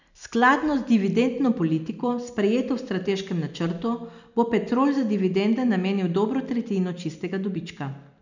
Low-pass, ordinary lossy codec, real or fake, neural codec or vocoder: 7.2 kHz; none; real; none